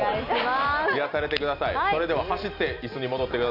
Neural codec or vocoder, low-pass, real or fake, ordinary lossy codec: none; 5.4 kHz; real; none